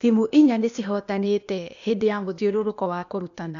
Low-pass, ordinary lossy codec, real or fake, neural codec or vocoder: 7.2 kHz; none; fake; codec, 16 kHz, 0.8 kbps, ZipCodec